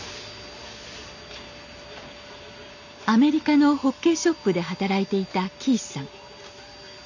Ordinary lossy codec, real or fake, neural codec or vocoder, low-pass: none; real; none; 7.2 kHz